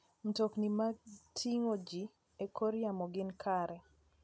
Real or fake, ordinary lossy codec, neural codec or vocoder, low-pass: real; none; none; none